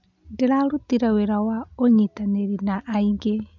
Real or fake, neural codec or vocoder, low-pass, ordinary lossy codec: real; none; 7.2 kHz; MP3, 64 kbps